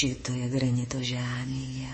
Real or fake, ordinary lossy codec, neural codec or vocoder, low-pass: fake; MP3, 32 kbps; codec, 24 kHz, 3.1 kbps, DualCodec; 10.8 kHz